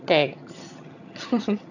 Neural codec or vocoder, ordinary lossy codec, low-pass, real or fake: vocoder, 22.05 kHz, 80 mel bands, HiFi-GAN; none; 7.2 kHz; fake